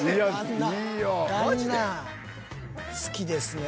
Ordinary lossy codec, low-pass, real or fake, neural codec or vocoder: none; none; real; none